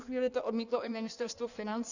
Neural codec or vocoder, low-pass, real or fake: codec, 16 kHz in and 24 kHz out, 1.1 kbps, FireRedTTS-2 codec; 7.2 kHz; fake